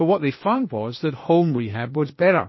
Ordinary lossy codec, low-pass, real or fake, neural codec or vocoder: MP3, 24 kbps; 7.2 kHz; fake; codec, 16 kHz, 0.8 kbps, ZipCodec